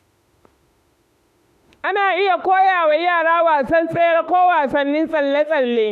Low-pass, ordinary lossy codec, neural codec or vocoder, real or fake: 14.4 kHz; none; autoencoder, 48 kHz, 32 numbers a frame, DAC-VAE, trained on Japanese speech; fake